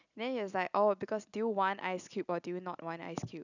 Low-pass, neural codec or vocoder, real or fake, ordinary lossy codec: 7.2 kHz; none; real; none